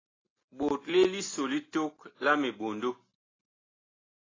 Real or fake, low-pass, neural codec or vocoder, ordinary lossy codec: real; 7.2 kHz; none; AAC, 32 kbps